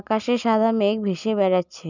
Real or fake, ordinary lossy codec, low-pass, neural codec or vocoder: real; none; 7.2 kHz; none